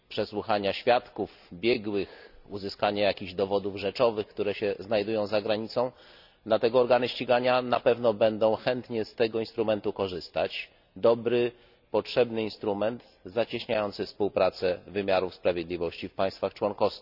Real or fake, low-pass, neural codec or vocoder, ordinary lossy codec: real; 5.4 kHz; none; none